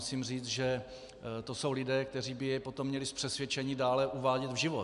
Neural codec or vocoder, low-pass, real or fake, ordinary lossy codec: none; 10.8 kHz; real; MP3, 96 kbps